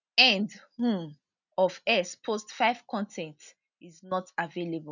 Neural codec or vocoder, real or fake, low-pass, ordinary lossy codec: none; real; 7.2 kHz; none